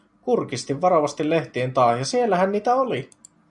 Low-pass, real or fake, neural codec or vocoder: 10.8 kHz; real; none